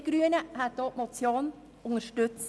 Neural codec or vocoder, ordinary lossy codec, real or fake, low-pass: none; none; real; none